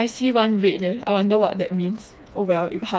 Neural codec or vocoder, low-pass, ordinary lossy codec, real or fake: codec, 16 kHz, 2 kbps, FreqCodec, smaller model; none; none; fake